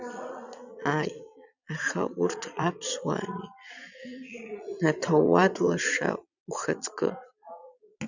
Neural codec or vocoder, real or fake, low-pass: vocoder, 22.05 kHz, 80 mel bands, Vocos; fake; 7.2 kHz